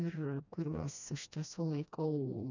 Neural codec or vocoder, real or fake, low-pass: codec, 16 kHz, 1 kbps, FreqCodec, smaller model; fake; 7.2 kHz